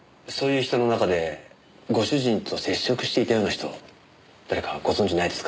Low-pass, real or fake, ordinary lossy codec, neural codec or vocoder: none; real; none; none